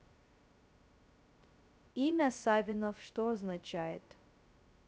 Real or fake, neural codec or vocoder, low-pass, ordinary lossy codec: fake; codec, 16 kHz, 0.2 kbps, FocalCodec; none; none